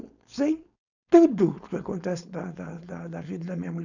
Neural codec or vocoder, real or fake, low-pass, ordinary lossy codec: codec, 16 kHz, 4.8 kbps, FACodec; fake; 7.2 kHz; none